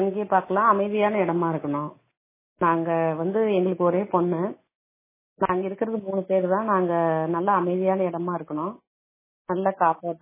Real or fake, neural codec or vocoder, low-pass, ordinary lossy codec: real; none; 3.6 kHz; MP3, 16 kbps